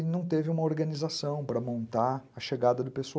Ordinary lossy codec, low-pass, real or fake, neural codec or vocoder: none; none; real; none